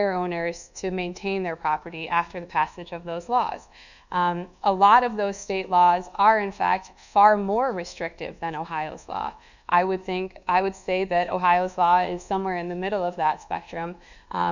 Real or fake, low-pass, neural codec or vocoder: fake; 7.2 kHz; codec, 24 kHz, 1.2 kbps, DualCodec